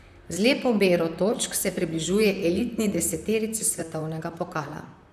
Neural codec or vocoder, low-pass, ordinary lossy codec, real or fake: vocoder, 44.1 kHz, 128 mel bands, Pupu-Vocoder; 14.4 kHz; none; fake